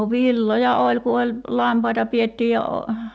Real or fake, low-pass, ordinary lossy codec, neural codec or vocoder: real; none; none; none